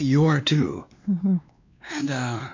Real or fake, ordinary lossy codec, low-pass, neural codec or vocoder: fake; MP3, 64 kbps; 7.2 kHz; codec, 16 kHz, 2 kbps, X-Codec, WavLM features, trained on Multilingual LibriSpeech